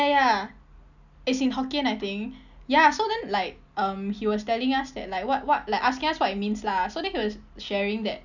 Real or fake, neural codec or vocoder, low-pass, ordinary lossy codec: real; none; 7.2 kHz; none